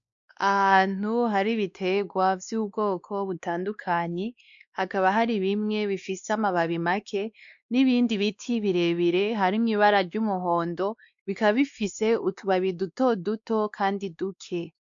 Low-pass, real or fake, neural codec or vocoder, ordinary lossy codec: 7.2 kHz; fake; codec, 16 kHz, 2 kbps, X-Codec, WavLM features, trained on Multilingual LibriSpeech; MP3, 64 kbps